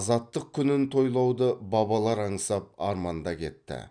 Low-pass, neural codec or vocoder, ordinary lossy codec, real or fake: 9.9 kHz; none; none; real